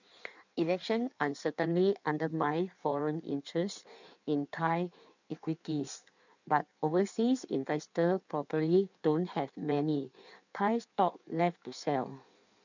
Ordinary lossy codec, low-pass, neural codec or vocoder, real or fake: none; 7.2 kHz; codec, 16 kHz in and 24 kHz out, 1.1 kbps, FireRedTTS-2 codec; fake